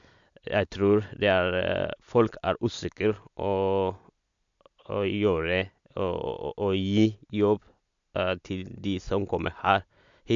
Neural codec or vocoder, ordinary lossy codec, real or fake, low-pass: none; MP3, 64 kbps; real; 7.2 kHz